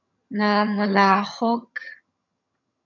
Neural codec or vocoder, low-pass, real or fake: vocoder, 22.05 kHz, 80 mel bands, HiFi-GAN; 7.2 kHz; fake